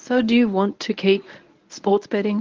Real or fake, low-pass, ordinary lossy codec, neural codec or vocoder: fake; 7.2 kHz; Opus, 24 kbps; codec, 24 kHz, 0.9 kbps, WavTokenizer, medium speech release version 1